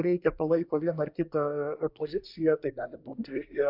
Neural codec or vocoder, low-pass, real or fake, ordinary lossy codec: codec, 24 kHz, 1 kbps, SNAC; 5.4 kHz; fake; MP3, 48 kbps